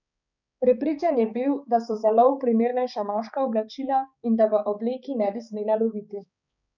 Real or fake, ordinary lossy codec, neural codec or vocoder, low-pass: fake; none; codec, 16 kHz, 4 kbps, X-Codec, HuBERT features, trained on balanced general audio; 7.2 kHz